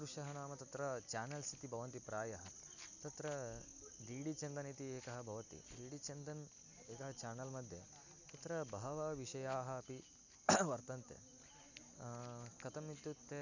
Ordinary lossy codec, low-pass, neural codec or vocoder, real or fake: none; 7.2 kHz; none; real